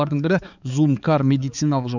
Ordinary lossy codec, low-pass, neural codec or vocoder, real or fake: none; 7.2 kHz; codec, 16 kHz, 4 kbps, X-Codec, HuBERT features, trained on balanced general audio; fake